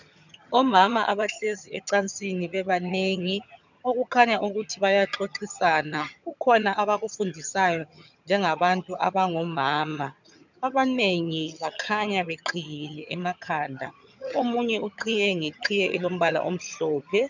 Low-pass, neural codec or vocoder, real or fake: 7.2 kHz; vocoder, 22.05 kHz, 80 mel bands, HiFi-GAN; fake